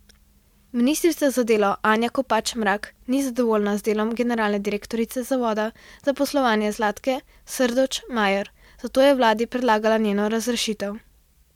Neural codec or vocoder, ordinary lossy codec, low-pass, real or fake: none; MP3, 96 kbps; 19.8 kHz; real